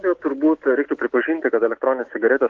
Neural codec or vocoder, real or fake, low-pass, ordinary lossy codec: none; real; 7.2 kHz; Opus, 16 kbps